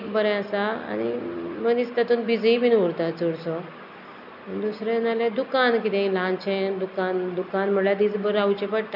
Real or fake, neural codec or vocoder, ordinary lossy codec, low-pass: real; none; none; 5.4 kHz